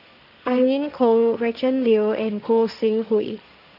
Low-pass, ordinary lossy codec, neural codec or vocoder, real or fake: 5.4 kHz; none; codec, 16 kHz, 1.1 kbps, Voila-Tokenizer; fake